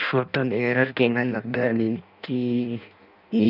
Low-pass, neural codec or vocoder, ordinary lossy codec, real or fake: 5.4 kHz; codec, 16 kHz in and 24 kHz out, 0.6 kbps, FireRedTTS-2 codec; none; fake